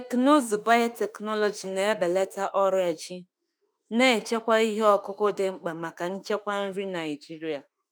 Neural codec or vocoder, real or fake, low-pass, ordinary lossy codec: autoencoder, 48 kHz, 32 numbers a frame, DAC-VAE, trained on Japanese speech; fake; none; none